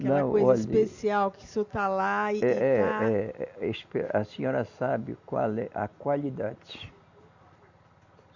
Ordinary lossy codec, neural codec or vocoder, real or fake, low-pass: Opus, 64 kbps; none; real; 7.2 kHz